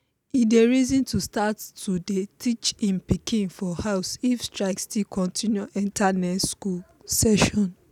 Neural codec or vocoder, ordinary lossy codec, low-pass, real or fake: none; none; 19.8 kHz; real